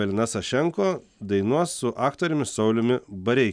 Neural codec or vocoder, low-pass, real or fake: none; 9.9 kHz; real